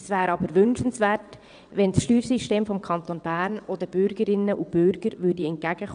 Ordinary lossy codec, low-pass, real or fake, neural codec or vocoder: none; 9.9 kHz; fake; vocoder, 22.05 kHz, 80 mel bands, WaveNeXt